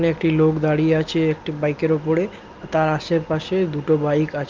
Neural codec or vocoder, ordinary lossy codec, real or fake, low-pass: none; Opus, 32 kbps; real; 7.2 kHz